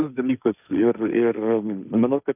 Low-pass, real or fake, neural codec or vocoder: 3.6 kHz; fake; codec, 16 kHz, 1.1 kbps, Voila-Tokenizer